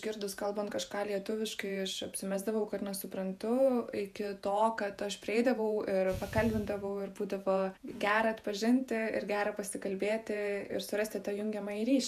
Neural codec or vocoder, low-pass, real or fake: vocoder, 48 kHz, 128 mel bands, Vocos; 14.4 kHz; fake